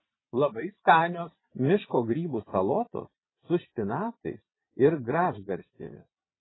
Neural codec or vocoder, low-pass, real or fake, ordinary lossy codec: vocoder, 22.05 kHz, 80 mel bands, Vocos; 7.2 kHz; fake; AAC, 16 kbps